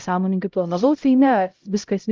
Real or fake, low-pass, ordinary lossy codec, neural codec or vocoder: fake; 7.2 kHz; Opus, 24 kbps; codec, 16 kHz, 0.5 kbps, X-Codec, HuBERT features, trained on LibriSpeech